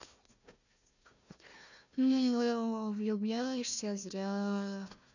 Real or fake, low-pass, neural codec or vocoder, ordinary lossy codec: fake; 7.2 kHz; codec, 16 kHz, 1 kbps, FunCodec, trained on Chinese and English, 50 frames a second; none